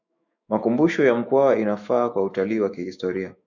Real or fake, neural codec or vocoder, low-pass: fake; autoencoder, 48 kHz, 128 numbers a frame, DAC-VAE, trained on Japanese speech; 7.2 kHz